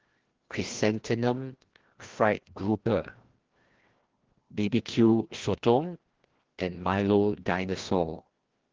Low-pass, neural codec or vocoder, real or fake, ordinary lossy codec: 7.2 kHz; codec, 16 kHz, 1 kbps, FreqCodec, larger model; fake; Opus, 16 kbps